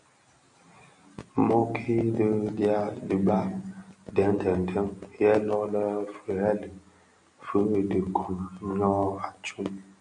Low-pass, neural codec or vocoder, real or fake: 9.9 kHz; none; real